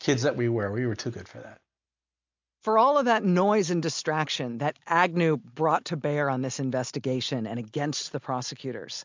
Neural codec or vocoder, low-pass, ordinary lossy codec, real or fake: none; 7.2 kHz; MP3, 64 kbps; real